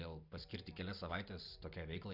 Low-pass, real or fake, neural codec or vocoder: 5.4 kHz; real; none